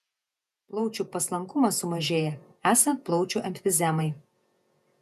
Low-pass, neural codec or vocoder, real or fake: 14.4 kHz; vocoder, 48 kHz, 128 mel bands, Vocos; fake